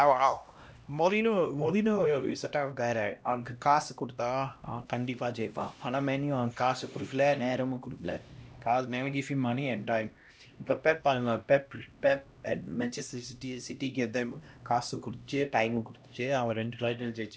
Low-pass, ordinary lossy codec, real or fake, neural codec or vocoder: none; none; fake; codec, 16 kHz, 1 kbps, X-Codec, HuBERT features, trained on LibriSpeech